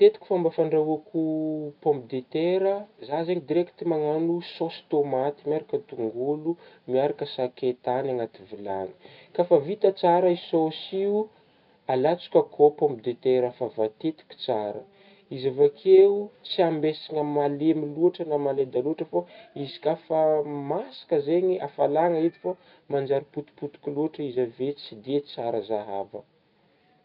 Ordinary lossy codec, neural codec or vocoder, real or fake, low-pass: none; none; real; 5.4 kHz